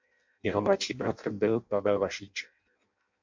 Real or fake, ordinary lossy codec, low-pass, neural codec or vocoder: fake; MP3, 48 kbps; 7.2 kHz; codec, 16 kHz in and 24 kHz out, 0.6 kbps, FireRedTTS-2 codec